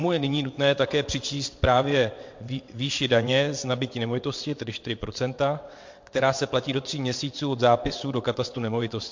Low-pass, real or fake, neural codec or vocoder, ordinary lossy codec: 7.2 kHz; fake; vocoder, 22.05 kHz, 80 mel bands, WaveNeXt; MP3, 48 kbps